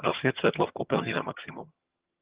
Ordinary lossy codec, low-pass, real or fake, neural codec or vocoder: Opus, 16 kbps; 3.6 kHz; fake; vocoder, 22.05 kHz, 80 mel bands, HiFi-GAN